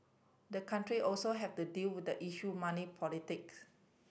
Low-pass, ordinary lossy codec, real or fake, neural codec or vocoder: none; none; real; none